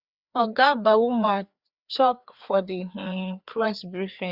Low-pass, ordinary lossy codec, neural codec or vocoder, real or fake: 5.4 kHz; Opus, 64 kbps; codec, 16 kHz, 2 kbps, FreqCodec, larger model; fake